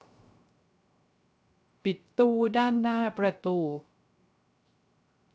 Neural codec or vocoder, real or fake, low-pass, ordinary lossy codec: codec, 16 kHz, 0.3 kbps, FocalCodec; fake; none; none